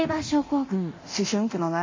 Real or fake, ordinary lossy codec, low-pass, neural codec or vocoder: fake; MP3, 32 kbps; 7.2 kHz; codec, 24 kHz, 0.9 kbps, DualCodec